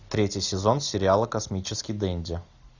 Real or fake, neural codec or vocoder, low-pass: real; none; 7.2 kHz